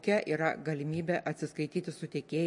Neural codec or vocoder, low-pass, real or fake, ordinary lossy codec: none; 10.8 kHz; real; MP3, 48 kbps